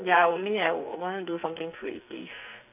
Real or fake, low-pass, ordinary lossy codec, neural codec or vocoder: fake; 3.6 kHz; none; codec, 44.1 kHz, 2.6 kbps, SNAC